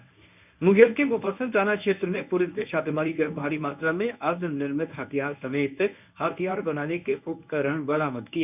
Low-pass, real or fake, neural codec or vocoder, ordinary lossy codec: 3.6 kHz; fake; codec, 24 kHz, 0.9 kbps, WavTokenizer, medium speech release version 1; none